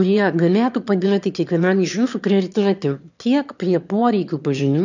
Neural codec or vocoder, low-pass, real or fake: autoencoder, 22.05 kHz, a latent of 192 numbers a frame, VITS, trained on one speaker; 7.2 kHz; fake